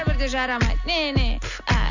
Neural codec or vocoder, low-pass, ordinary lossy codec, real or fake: none; 7.2 kHz; none; real